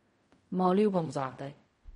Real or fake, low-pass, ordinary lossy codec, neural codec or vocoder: fake; 10.8 kHz; MP3, 48 kbps; codec, 16 kHz in and 24 kHz out, 0.4 kbps, LongCat-Audio-Codec, fine tuned four codebook decoder